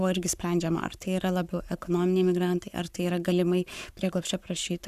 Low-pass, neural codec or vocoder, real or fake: 14.4 kHz; codec, 44.1 kHz, 7.8 kbps, Pupu-Codec; fake